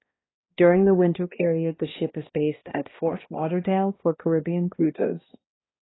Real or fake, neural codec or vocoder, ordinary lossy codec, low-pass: fake; codec, 16 kHz, 1 kbps, X-Codec, HuBERT features, trained on balanced general audio; AAC, 16 kbps; 7.2 kHz